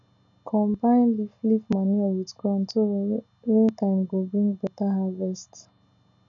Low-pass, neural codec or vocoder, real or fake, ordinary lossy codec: 7.2 kHz; none; real; none